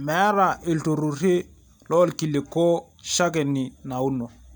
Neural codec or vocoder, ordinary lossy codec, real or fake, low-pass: none; none; real; none